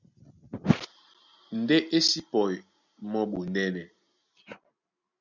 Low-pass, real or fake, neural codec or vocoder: 7.2 kHz; real; none